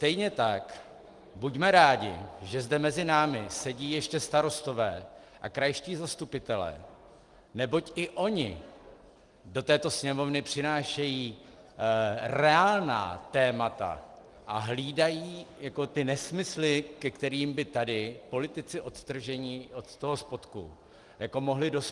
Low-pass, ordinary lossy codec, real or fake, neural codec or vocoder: 10.8 kHz; Opus, 24 kbps; real; none